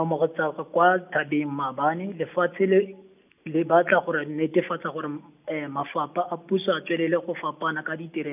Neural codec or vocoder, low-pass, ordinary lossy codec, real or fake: none; 3.6 kHz; none; real